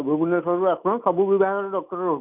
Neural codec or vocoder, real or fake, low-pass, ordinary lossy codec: none; real; 3.6 kHz; none